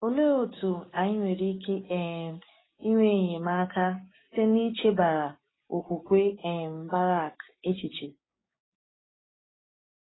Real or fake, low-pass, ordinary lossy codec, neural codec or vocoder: fake; 7.2 kHz; AAC, 16 kbps; codec, 16 kHz, 6 kbps, DAC